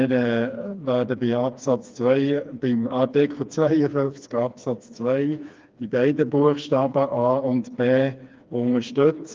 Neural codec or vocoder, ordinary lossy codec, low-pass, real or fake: codec, 16 kHz, 2 kbps, FreqCodec, smaller model; Opus, 24 kbps; 7.2 kHz; fake